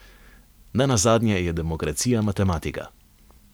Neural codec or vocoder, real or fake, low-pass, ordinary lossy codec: none; real; none; none